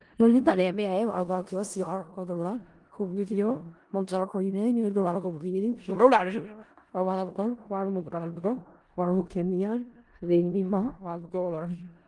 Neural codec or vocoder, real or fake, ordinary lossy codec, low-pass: codec, 16 kHz in and 24 kHz out, 0.4 kbps, LongCat-Audio-Codec, four codebook decoder; fake; Opus, 24 kbps; 10.8 kHz